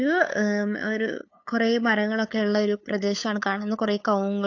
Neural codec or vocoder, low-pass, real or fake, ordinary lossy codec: codec, 16 kHz, 8 kbps, FunCodec, trained on LibriTTS, 25 frames a second; 7.2 kHz; fake; AAC, 48 kbps